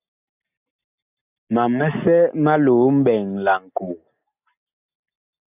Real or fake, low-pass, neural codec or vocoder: real; 3.6 kHz; none